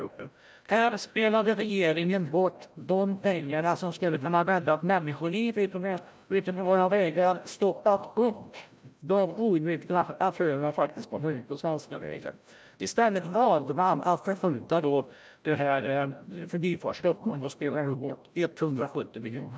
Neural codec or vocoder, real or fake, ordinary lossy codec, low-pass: codec, 16 kHz, 0.5 kbps, FreqCodec, larger model; fake; none; none